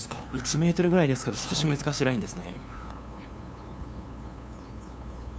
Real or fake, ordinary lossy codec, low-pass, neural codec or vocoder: fake; none; none; codec, 16 kHz, 2 kbps, FunCodec, trained on LibriTTS, 25 frames a second